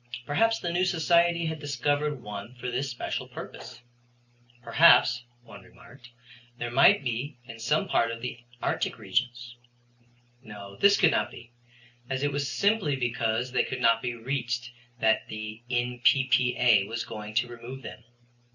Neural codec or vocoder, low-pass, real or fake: none; 7.2 kHz; real